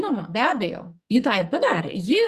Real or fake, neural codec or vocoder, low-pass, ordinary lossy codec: fake; codec, 44.1 kHz, 2.6 kbps, SNAC; 14.4 kHz; Opus, 64 kbps